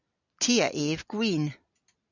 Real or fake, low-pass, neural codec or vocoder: real; 7.2 kHz; none